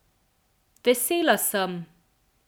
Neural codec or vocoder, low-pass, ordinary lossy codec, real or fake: none; none; none; real